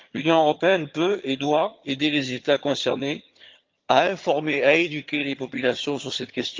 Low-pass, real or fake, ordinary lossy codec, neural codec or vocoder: 7.2 kHz; fake; Opus, 24 kbps; vocoder, 22.05 kHz, 80 mel bands, HiFi-GAN